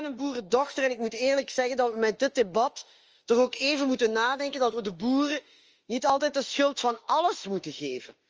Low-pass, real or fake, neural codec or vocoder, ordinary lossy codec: 7.2 kHz; fake; autoencoder, 48 kHz, 32 numbers a frame, DAC-VAE, trained on Japanese speech; Opus, 24 kbps